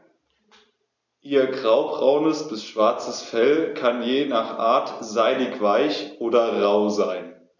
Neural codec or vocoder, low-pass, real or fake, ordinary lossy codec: none; 7.2 kHz; real; none